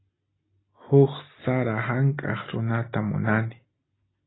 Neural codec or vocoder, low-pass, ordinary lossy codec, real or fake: none; 7.2 kHz; AAC, 16 kbps; real